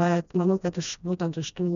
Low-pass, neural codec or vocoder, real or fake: 7.2 kHz; codec, 16 kHz, 1 kbps, FreqCodec, smaller model; fake